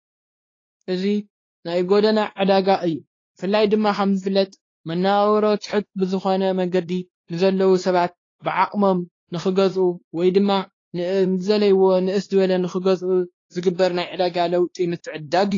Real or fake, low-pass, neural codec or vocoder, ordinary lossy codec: fake; 7.2 kHz; codec, 16 kHz, 2 kbps, X-Codec, WavLM features, trained on Multilingual LibriSpeech; AAC, 32 kbps